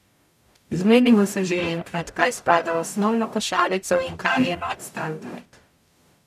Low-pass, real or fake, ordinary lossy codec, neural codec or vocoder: 14.4 kHz; fake; none; codec, 44.1 kHz, 0.9 kbps, DAC